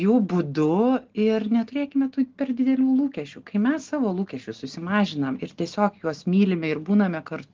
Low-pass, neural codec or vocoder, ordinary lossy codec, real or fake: 7.2 kHz; none; Opus, 16 kbps; real